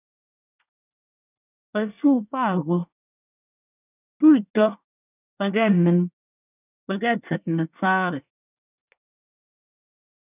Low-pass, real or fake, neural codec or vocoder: 3.6 kHz; fake; codec, 24 kHz, 1 kbps, SNAC